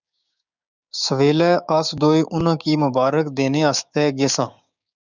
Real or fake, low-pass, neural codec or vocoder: fake; 7.2 kHz; codec, 16 kHz, 6 kbps, DAC